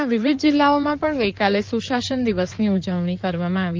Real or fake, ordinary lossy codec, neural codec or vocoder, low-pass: fake; Opus, 32 kbps; codec, 16 kHz in and 24 kHz out, 2.2 kbps, FireRedTTS-2 codec; 7.2 kHz